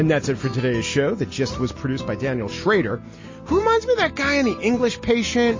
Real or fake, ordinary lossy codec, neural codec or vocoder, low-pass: real; MP3, 32 kbps; none; 7.2 kHz